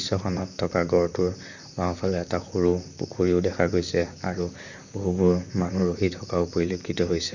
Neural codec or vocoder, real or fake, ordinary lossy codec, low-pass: vocoder, 44.1 kHz, 128 mel bands, Pupu-Vocoder; fake; none; 7.2 kHz